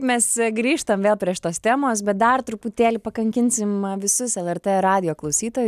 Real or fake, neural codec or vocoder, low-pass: real; none; 14.4 kHz